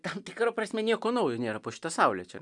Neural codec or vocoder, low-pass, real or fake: none; 10.8 kHz; real